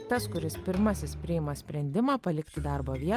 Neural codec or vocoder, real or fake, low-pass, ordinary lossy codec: none; real; 14.4 kHz; Opus, 32 kbps